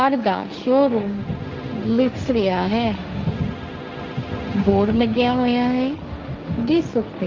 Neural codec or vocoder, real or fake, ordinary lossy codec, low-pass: codec, 16 kHz, 1.1 kbps, Voila-Tokenizer; fake; Opus, 32 kbps; 7.2 kHz